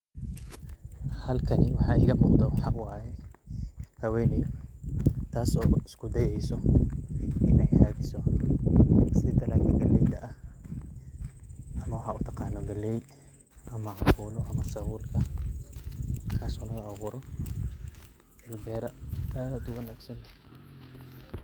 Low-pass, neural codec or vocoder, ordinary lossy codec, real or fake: 19.8 kHz; autoencoder, 48 kHz, 128 numbers a frame, DAC-VAE, trained on Japanese speech; Opus, 32 kbps; fake